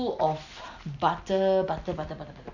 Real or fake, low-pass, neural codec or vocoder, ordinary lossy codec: real; 7.2 kHz; none; none